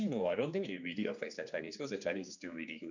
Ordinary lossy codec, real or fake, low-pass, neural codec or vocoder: none; fake; 7.2 kHz; codec, 16 kHz, 2 kbps, X-Codec, HuBERT features, trained on general audio